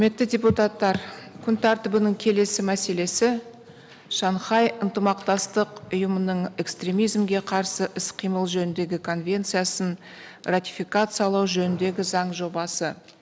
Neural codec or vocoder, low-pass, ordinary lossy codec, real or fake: none; none; none; real